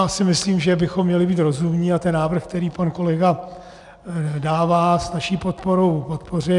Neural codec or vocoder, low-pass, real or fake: none; 10.8 kHz; real